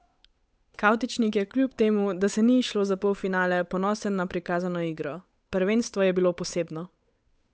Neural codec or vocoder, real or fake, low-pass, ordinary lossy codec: codec, 16 kHz, 8 kbps, FunCodec, trained on Chinese and English, 25 frames a second; fake; none; none